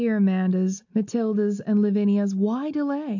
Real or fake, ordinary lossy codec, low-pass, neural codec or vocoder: real; AAC, 48 kbps; 7.2 kHz; none